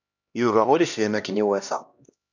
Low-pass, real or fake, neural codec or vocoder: 7.2 kHz; fake; codec, 16 kHz, 1 kbps, X-Codec, HuBERT features, trained on LibriSpeech